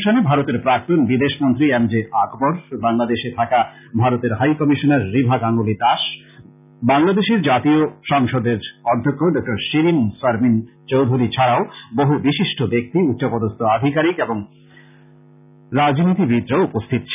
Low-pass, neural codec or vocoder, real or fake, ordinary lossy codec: 3.6 kHz; none; real; none